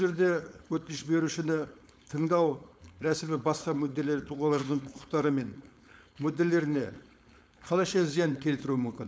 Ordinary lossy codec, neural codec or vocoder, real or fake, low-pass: none; codec, 16 kHz, 4.8 kbps, FACodec; fake; none